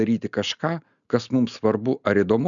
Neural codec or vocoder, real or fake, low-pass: none; real; 7.2 kHz